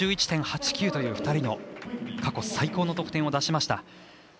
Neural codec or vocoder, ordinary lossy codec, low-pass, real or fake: none; none; none; real